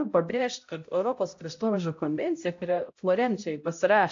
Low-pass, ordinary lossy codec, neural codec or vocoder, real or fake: 7.2 kHz; AAC, 48 kbps; codec, 16 kHz, 0.5 kbps, X-Codec, HuBERT features, trained on balanced general audio; fake